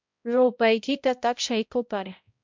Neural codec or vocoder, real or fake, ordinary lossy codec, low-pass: codec, 16 kHz, 0.5 kbps, X-Codec, HuBERT features, trained on balanced general audio; fake; MP3, 64 kbps; 7.2 kHz